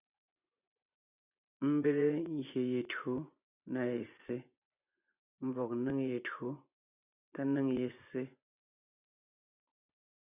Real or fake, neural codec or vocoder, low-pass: fake; vocoder, 24 kHz, 100 mel bands, Vocos; 3.6 kHz